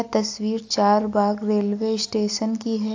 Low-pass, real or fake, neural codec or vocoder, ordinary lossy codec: 7.2 kHz; real; none; AAC, 48 kbps